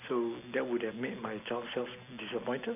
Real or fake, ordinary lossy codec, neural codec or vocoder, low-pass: real; none; none; 3.6 kHz